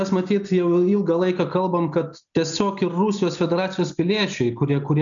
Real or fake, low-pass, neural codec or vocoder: real; 7.2 kHz; none